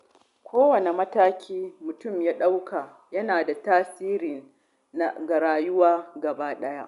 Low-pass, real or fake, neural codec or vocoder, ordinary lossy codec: 10.8 kHz; real; none; none